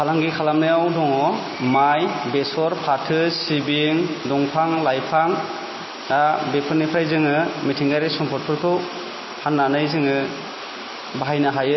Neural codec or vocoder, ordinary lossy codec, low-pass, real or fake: none; MP3, 24 kbps; 7.2 kHz; real